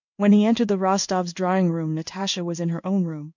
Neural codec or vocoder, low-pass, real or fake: codec, 16 kHz in and 24 kHz out, 1 kbps, XY-Tokenizer; 7.2 kHz; fake